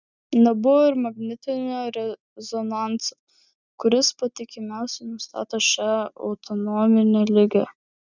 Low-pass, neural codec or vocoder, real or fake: 7.2 kHz; none; real